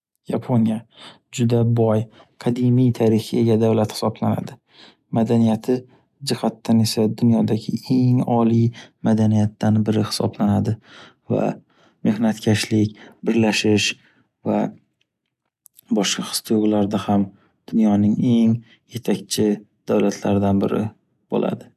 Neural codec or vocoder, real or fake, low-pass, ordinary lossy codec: none; real; 14.4 kHz; none